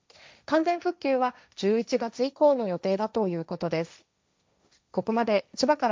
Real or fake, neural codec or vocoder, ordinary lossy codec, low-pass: fake; codec, 16 kHz, 1.1 kbps, Voila-Tokenizer; none; none